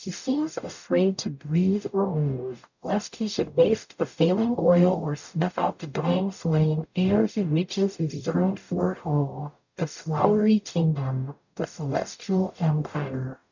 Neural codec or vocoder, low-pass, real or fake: codec, 44.1 kHz, 0.9 kbps, DAC; 7.2 kHz; fake